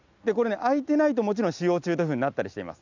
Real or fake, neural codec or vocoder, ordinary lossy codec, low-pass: real; none; none; 7.2 kHz